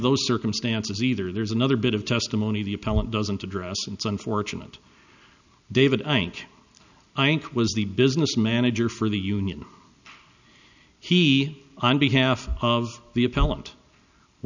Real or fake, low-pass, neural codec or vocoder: real; 7.2 kHz; none